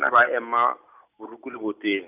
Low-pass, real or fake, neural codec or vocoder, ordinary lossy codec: 3.6 kHz; real; none; none